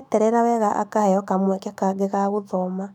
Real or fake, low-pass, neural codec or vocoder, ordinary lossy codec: fake; 19.8 kHz; autoencoder, 48 kHz, 128 numbers a frame, DAC-VAE, trained on Japanese speech; none